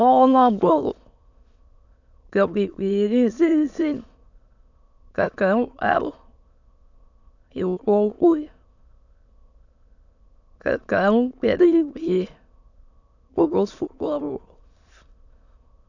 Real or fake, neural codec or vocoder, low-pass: fake; autoencoder, 22.05 kHz, a latent of 192 numbers a frame, VITS, trained on many speakers; 7.2 kHz